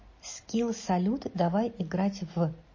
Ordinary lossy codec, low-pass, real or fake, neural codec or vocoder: MP3, 32 kbps; 7.2 kHz; real; none